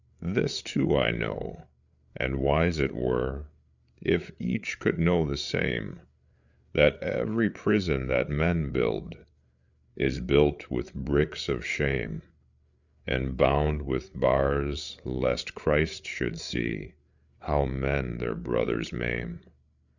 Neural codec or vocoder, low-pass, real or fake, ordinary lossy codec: codec, 16 kHz, 8 kbps, FreqCodec, larger model; 7.2 kHz; fake; Opus, 64 kbps